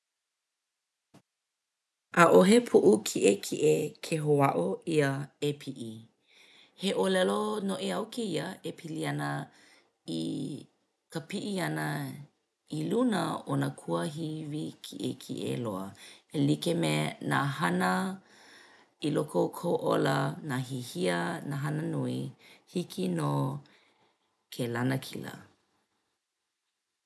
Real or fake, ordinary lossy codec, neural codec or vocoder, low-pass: real; none; none; none